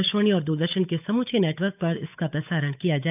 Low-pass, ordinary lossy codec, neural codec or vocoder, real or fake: 3.6 kHz; none; codec, 16 kHz, 8 kbps, FunCodec, trained on Chinese and English, 25 frames a second; fake